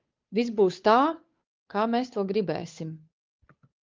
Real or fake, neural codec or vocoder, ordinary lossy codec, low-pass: fake; codec, 16 kHz, 8 kbps, FunCodec, trained on Chinese and English, 25 frames a second; Opus, 24 kbps; 7.2 kHz